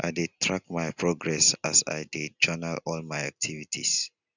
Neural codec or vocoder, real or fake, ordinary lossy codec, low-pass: none; real; none; 7.2 kHz